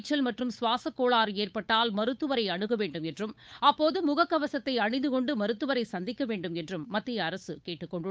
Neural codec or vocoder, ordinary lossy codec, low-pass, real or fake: codec, 16 kHz, 8 kbps, FunCodec, trained on Chinese and English, 25 frames a second; none; none; fake